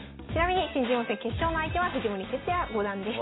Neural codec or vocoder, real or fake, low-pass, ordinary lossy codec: none; real; 7.2 kHz; AAC, 16 kbps